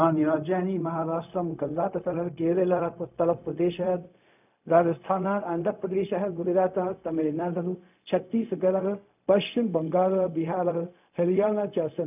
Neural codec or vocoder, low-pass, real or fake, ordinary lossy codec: codec, 16 kHz, 0.4 kbps, LongCat-Audio-Codec; 3.6 kHz; fake; none